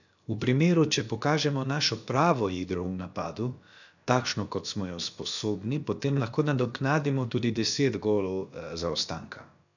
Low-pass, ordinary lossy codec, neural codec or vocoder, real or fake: 7.2 kHz; none; codec, 16 kHz, about 1 kbps, DyCAST, with the encoder's durations; fake